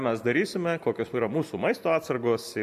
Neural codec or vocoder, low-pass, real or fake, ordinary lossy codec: none; 14.4 kHz; real; MP3, 64 kbps